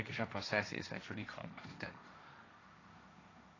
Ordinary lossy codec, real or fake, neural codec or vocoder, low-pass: AAC, 32 kbps; fake; codec, 16 kHz, 1.1 kbps, Voila-Tokenizer; 7.2 kHz